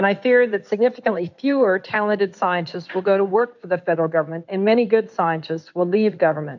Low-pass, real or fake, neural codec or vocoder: 7.2 kHz; fake; codec, 16 kHz in and 24 kHz out, 2.2 kbps, FireRedTTS-2 codec